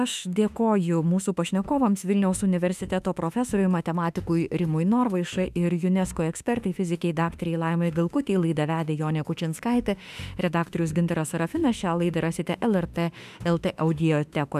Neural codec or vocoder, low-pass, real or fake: autoencoder, 48 kHz, 32 numbers a frame, DAC-VAE, trained on Japanese speech; 14.4 kHz; fake